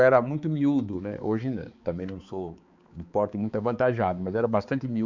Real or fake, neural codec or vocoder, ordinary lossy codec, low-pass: fake; codec, 16 kHz, 4 kbps, X-Codec, HuBERT features, trained on balanced general audio; Opus, 64 kbps; 7.2 kHz